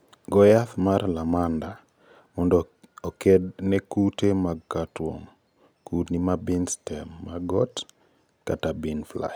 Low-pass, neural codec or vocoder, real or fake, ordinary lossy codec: none; none; real; none